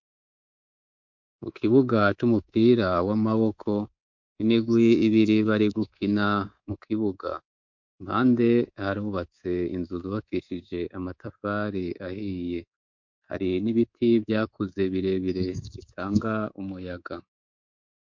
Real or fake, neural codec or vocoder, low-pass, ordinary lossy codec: fake; codec, 24 kHz, 3.1 kbps, DualCodec; 7.2 kHz; MP3, 48 kbps